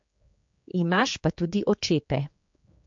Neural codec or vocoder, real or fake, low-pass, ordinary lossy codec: codec, 16 kHz, 4 kbps, X-Codec, HuBERT features, trained on general audio; fake; 7.2 kHz; MP3, 48 kbps